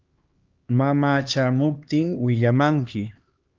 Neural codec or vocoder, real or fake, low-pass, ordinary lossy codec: codec, 16 kHz, 2 kbps, X-Codec, HuBERT features, trained on LibriSpeech; fake; 7.2 kHz; Opus, 32 kbps